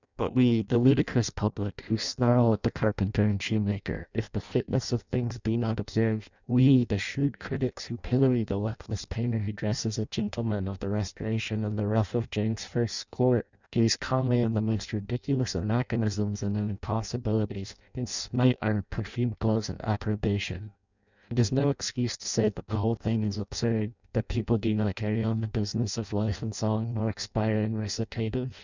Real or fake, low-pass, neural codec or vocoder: fake; 7.2 kHz; codec, 16 kHz in and 24 kHz out, 0.6 kbps, FireRedTTS-2 codec